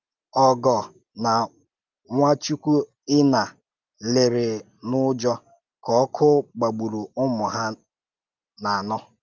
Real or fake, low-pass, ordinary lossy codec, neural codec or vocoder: real; 7.2 kHz; Opus, 24 kbps; none